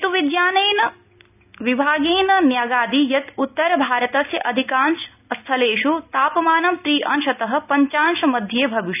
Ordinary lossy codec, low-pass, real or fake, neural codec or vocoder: none; 3.6 kHz; real; none